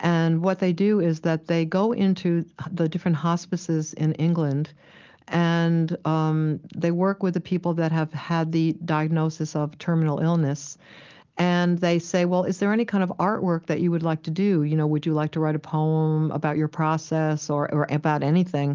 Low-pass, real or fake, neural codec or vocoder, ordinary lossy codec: 7.2 kHz; real; none; Opus, 32 kbps